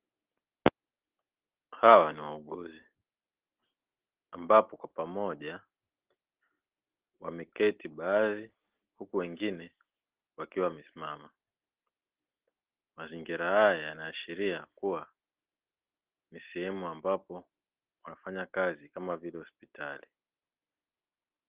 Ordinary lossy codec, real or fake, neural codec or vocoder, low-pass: Opus, 16 kbps; real; none; 3.6 kHz